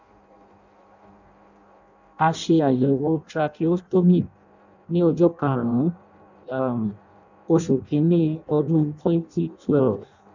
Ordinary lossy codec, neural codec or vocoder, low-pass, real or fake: none; codec, 16 kHz in and 24 kHz out, 0.6 kbps, FireRedTTS-2 codec; 7.2 kHz; fake